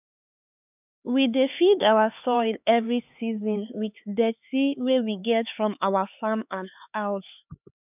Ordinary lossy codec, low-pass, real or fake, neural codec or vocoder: none; 3.6 kHz; fake; codec, 16 kHz, 4 kbps, X-Codec, HuBERT features, trained on LibriSpeech